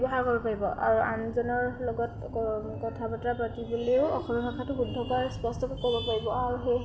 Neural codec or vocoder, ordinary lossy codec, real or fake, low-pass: none; none; real; none